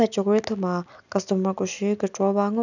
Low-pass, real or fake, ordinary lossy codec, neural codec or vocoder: 7.2 kHz; real; none; none